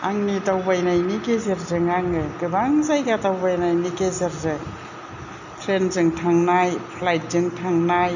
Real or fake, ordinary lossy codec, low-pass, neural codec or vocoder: real; none; 7.2 kHz; none